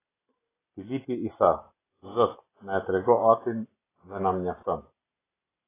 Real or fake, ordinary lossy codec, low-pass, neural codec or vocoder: real; AAC, 16 kbps; 3.6 kHz; none